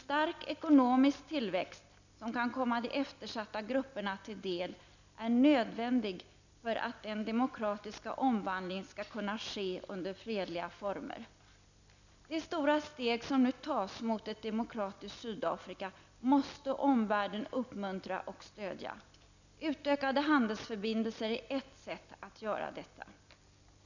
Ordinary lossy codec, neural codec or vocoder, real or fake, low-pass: none; none; real; 7.2 kHz